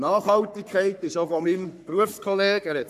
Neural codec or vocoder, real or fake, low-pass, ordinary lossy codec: codec, 44.1 kHz, 3.4 kbps, Pupu-Codec; fake; 14.4 kHz; none